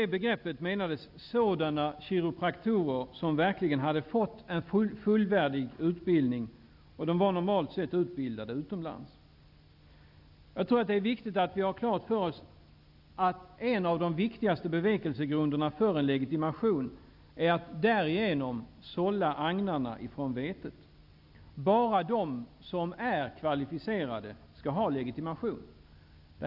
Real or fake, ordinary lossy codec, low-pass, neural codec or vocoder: real; none; 5.4 kHz; none